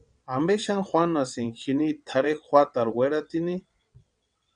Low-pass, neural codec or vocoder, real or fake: 9.9 kHz; vocoder, 22.05 kHz, 80 mel bands, WaveNeXt; fake